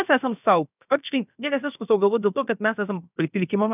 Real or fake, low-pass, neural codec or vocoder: fake; 3.6 kHz; codec, 16 kHz, about 1 kbps, DyCAST, with the encoder's durations